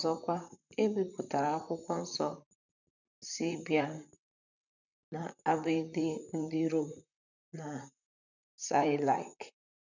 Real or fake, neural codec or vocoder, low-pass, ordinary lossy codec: fake; vocoder, 44.1 kHz, 128 mel bands, Pupu-Vocoder; 7.2 kHz; none